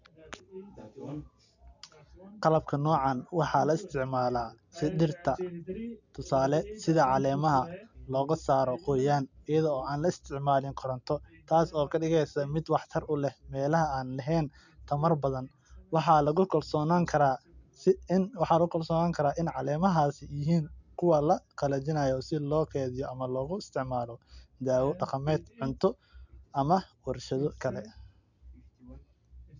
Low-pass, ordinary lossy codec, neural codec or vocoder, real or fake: 7.2 kHz; none; none; real